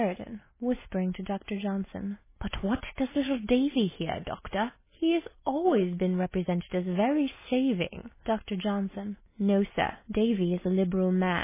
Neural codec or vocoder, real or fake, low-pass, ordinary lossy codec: none; real; 3.6 kHz; MP3, 16 kbps